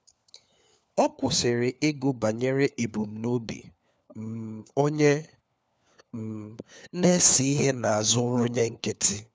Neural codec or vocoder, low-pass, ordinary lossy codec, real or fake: codec, 16 kHz, 4 kbps, FunCodec, trained on LibriTTS, 50 frames a second; none; none; fake